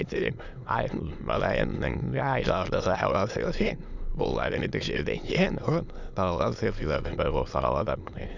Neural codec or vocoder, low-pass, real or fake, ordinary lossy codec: autoencoder, 22.05 kHz, a latent of 192 numbers a frame, VITS, trained on many speakers; 7.2 kHz; fake; none